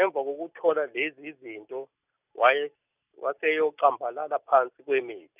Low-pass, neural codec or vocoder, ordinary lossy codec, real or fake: 3.6 kHz; none; none; real